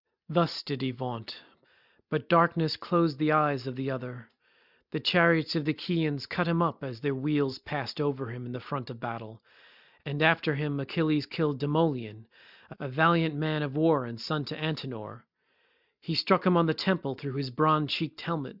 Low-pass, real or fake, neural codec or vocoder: 5.4 kHz; real; none